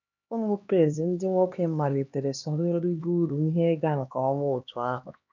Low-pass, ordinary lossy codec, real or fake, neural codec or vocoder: 7.2 kHz; none; fake; codec, 16 kHz, 2 kbps, X-Codec, HuBERT features, trained on LibriSpeech